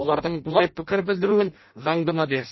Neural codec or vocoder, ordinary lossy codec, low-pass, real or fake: codec, 16 kHz in and 24 kHz out, 0.6 kbps, FireRedTTS-2 codec; MP3, 24 kbps; 7.2 kHz; fake